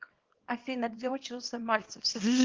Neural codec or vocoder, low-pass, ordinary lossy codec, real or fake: codec, 24 kHz, 0.9 kbps, WavTokenizer, small release; 7.2 kHz; Opus, 16 kbps; fake